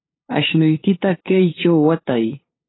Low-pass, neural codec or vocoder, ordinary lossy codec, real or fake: 7.2 kHz; codec, 16 kHz, 8 kbps, FunCodec, trained on LibriTTS, 25 frames a second; AAC, 16 kbps; fake